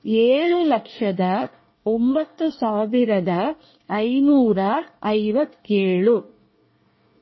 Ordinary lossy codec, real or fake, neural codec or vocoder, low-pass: MP3, 24 kbps; fake; codec, 24 kHz, 1 kbps, SNAC; 7.2 kHz